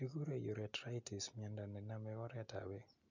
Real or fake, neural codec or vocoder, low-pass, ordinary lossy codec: real; none; 7.2 kHz; none